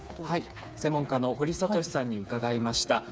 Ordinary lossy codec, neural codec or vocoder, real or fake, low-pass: none; codec, 16 kHz, 4 kbps, FreqCodec, smaller model; fake; none